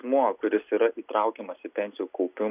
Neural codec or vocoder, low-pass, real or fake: none; 3.6 kHz; real